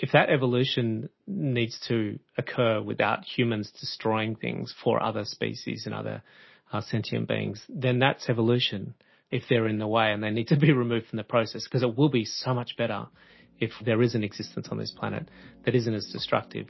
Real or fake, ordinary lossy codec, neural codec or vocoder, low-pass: real; MP3, 24 kbps; none; 7.2 kHz